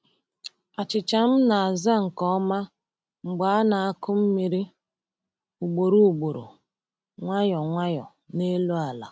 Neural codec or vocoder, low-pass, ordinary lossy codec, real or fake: none; none; none; real